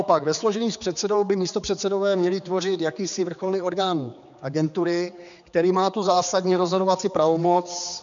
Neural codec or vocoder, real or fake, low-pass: codec, 16 kHz, 4 kbps, X-Codec, HuBERT features, trained on general audio; fake; 7.2 kHz